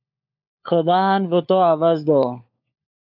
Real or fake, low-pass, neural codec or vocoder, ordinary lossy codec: fake; 5.4 kHz; codec, 16 kHz, 4 kbps, FunCodec, trained on LibriTTS, 50 frames a second; AAC, 48 kbps